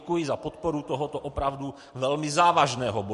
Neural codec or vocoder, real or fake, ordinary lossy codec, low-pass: none; real; MP3, 48 kbps; 14.4 kHz